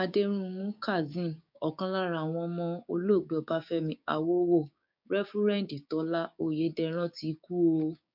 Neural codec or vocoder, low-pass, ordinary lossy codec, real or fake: codec, 16 kHz, 6 kbps, DAC; 5.4 kHz; MP3, 48 kbps; fake